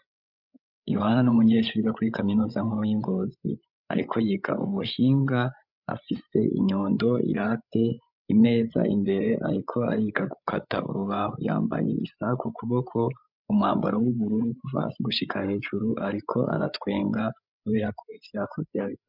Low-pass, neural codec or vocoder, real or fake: 5.4 kHz; codec, 16 kHz, 8 kbps, FreqCodec, larger model; fake